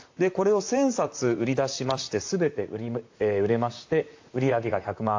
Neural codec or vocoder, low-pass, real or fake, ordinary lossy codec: vocoder, 44.1 kHz, 128 mel bands, Pupu-Vocoder; 7.2 kHz; fake; AAC, 48 kbps